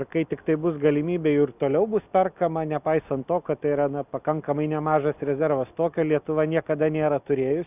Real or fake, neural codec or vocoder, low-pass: real; none; 3.6 kHz